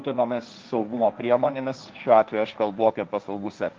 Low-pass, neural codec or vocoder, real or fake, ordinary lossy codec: 7.2 kHz; codec, 16 kHz, 1.1 kbps, Voila-Tokenizer; fake; Opus, 32 kbps